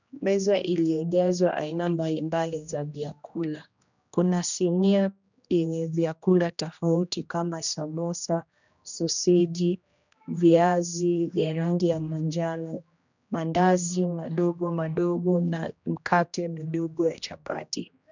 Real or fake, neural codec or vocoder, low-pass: fake; codec, 16 kHz, 1 kbps, X-Codec, HuBERT features, trained on general audio; 7.2 kHz